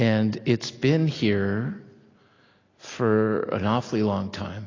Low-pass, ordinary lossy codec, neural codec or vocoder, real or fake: 7.2 kHz; AAC, 32 kbps; none; real